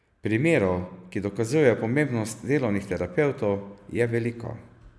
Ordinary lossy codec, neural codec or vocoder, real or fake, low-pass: none; none; real; none